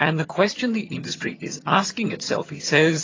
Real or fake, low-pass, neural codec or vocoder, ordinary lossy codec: fake; 7.2 kHz; vocoder, 22.05 kHz, 80 mel bands, HiFi-GAN; AAC, 32 kbps